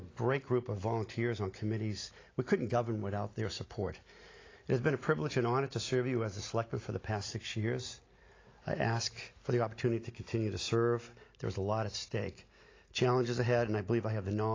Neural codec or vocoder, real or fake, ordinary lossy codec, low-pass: none; real; AAC, 32 kbps; 7.2 kHz